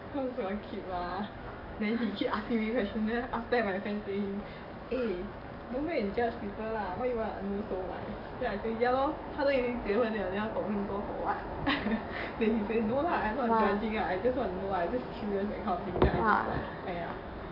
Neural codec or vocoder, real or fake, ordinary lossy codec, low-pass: codec, 44.1 kHz, 7.8 kbps, DAC; fake; none; 5.4 kHz